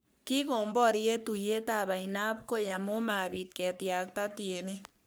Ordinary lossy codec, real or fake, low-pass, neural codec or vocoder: none; fake; none; codec, 44.1 kHz, 3.4 kbps, Pupu-Codec